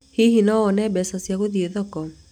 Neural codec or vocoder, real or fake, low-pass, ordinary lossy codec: none; real; 19.8 kHz; none